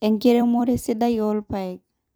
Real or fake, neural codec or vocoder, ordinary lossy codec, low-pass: fake; vocoder, 44.1 kHz, 128 mel bands every 256 samples, BigVGAN v2; none; none